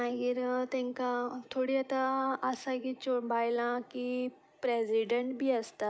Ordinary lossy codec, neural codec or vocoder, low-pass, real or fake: none; none; none; real